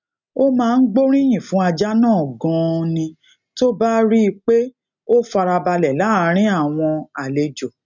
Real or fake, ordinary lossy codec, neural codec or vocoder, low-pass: real; none; none; 7.2 kHz